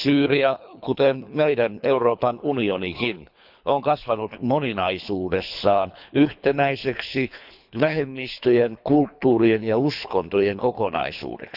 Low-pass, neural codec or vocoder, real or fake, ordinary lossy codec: 5.4 kHz; codec, 24 kHz, 3 kbps, HILCodec; fake; none